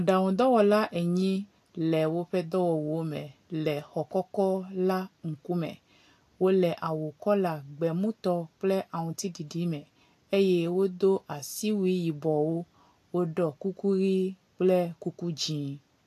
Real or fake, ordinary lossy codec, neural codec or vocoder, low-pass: real; AAC, 64 kbps; none; 14.4 kHz